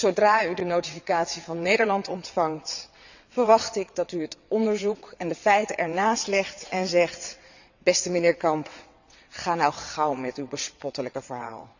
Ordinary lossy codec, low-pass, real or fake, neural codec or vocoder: none; 7.2 kHz; fake; vocoder, 22.05 kHz, 80 mel bands, WaveNeXt